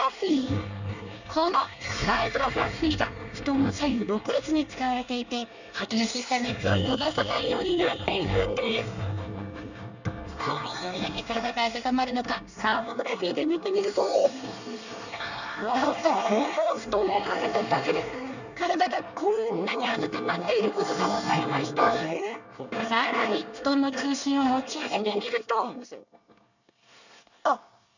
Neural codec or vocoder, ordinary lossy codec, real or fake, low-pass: codec, 24 kHz, 1 kbps, SNAC; none; fake; 7.2 kHz